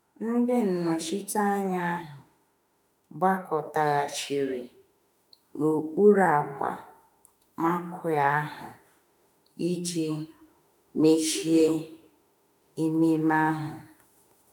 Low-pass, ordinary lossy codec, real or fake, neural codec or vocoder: none; none; fake; autoencoder, 48 kHz, 32 numbers a frame, DAC-VAE, trained on Japanese speech